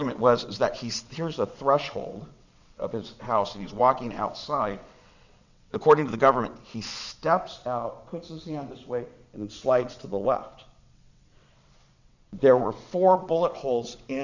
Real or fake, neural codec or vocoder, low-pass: fake; vocoder, 22.05 kHz, 80 mel bands, WaveNeXt; 7.2 kHz